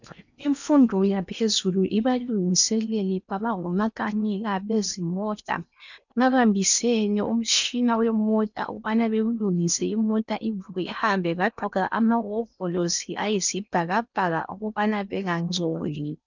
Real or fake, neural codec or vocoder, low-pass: fake; codec, 16 kHz in and 24 kHz out, 0.8 kbps, FocalCodec, streaming, 65536 codes; 7.2 kHz